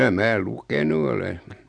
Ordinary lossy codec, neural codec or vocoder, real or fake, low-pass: none; none; real; 9.9 kHz